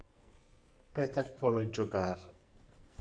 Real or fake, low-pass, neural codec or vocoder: fake; 9.9 kHz; codec, 44.1 kHz, 2.6 kbps, SNAC